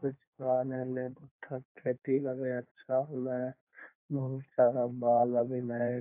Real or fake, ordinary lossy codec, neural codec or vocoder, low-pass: fake; AAC, 32 kbps; codec, 16 kHz in and 24 kHz out, 1.1 kbps, FireRedTTS-2 codec; 3.6 kHz